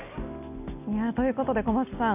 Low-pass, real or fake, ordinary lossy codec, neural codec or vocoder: 3.6 kHz; real; none; none